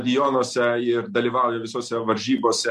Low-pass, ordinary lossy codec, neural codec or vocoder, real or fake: 14.4 kHz; MP3, 64 kbps; none; real